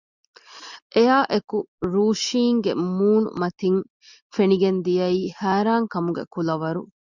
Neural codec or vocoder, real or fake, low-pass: none; real; 7.2 kHz